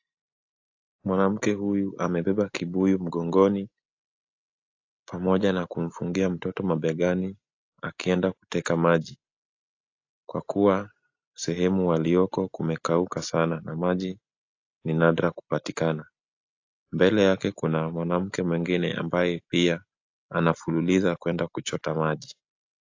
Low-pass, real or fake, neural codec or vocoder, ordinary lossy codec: 7.2 kHz; real; none; AAC, 48 kbps